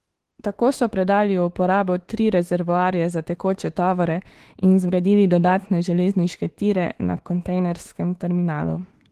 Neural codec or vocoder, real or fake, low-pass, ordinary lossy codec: autoencoder, 48 kHz, 32 numbers a frame, DAC-VAE, trained on Japanese speech; fake; 14.4 kHz; Opus, 16 kbps